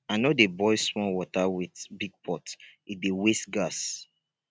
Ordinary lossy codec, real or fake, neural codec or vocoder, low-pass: none; real; none; none